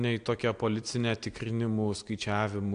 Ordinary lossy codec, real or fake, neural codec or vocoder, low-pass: AAC, 64 kbps; real; none; 9.9 kHz